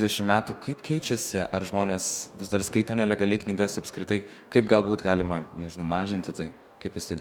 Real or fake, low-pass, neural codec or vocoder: fake; 19.8 kHz; codec, 44.1 kHz, 2.6 kbps, DAC